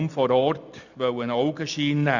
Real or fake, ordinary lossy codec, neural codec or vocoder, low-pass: real; none; none; 7.2 kHz